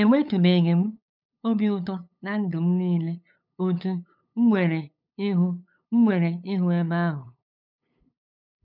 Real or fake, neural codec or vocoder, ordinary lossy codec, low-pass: fake; codec, 16 kHz, 8 kbps, FunCodec, trained on LibriTTS, 25 frames a second; none; 5.4 kHz